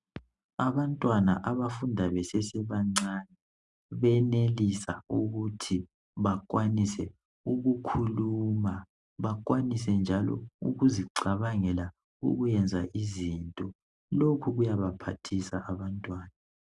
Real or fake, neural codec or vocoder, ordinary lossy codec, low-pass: real; none; Opus, 64 kbps; 10.8 kHz